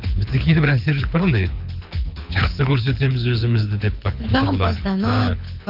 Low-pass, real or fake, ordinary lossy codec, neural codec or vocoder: 5.4 kHz; fake; none; codec, 24 kHz, 6 kbps, HILCodec